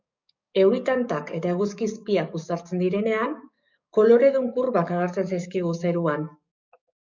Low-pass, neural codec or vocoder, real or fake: 7.2 kHz; codec, 44.1 kHz, 7.8 kbps, DAC; fake